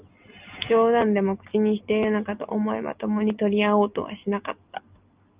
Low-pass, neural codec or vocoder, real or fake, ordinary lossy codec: 3.6 kHz; none; real; Opus, 32 kbps